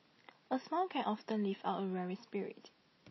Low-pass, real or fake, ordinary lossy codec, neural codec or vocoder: 7.2 kHz; real; MP3, 24 kbps; none